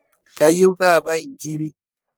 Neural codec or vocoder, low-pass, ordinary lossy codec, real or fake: codec, 44.1 kHz, 1.7 kbps, Pupu-Codec; none; none; fake